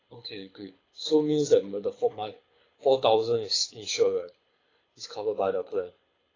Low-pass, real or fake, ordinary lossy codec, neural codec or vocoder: 7.2 kHz; fake; AAC, 32 kbps; codec, 24 kHz, 6 kbps, HILCodec